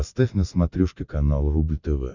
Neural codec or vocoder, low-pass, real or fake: none; 7.2 kHz; real